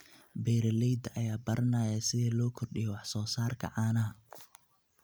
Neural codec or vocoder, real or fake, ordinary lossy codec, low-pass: none; real; none; none